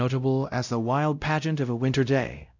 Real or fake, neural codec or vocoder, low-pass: fake; codec, 16 kHz, 0.5 kbps, X-Codec, WavLM features, trained on Multilingual LibriSpeech; 7.2 kHz